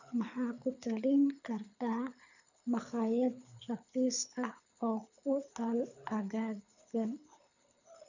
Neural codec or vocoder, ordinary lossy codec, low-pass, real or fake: codec, 24 kHz, 6 kbps, HILCodec; none; 7.2 kHz; fake